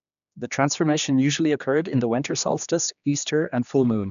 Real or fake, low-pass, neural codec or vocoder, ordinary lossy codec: fake; 7.2 kHz; codec, 16 kHz, 2 kbps, X-Codec, HuBERT features, trained on general audio; none